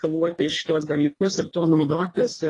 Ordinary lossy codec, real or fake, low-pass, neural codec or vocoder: AAC, 32 kbps; fake; 10.8 kHz; codec, 24 kHz, 1.5 kbps, HILCodec